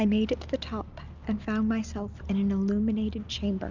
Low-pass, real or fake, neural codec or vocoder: 7.2 kHz; real; none